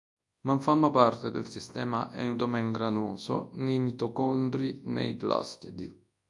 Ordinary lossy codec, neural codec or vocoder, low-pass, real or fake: AAC, 64 kbps; codec, 24 kHz, 0.9 kbps, WavTokenizer, large speech release; 10.8 kHz; fake